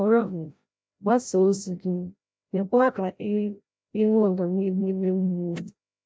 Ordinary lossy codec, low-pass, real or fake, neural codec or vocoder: none; none; fake; codec, 16 kHz, 0.5 kbps, FreqCodec, larger model